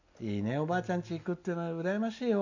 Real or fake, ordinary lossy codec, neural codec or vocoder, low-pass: real; none; none; 7.2 kHz